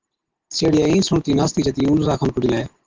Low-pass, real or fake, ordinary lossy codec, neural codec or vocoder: 7.2 kHz; real; Opus, 16 kbps; none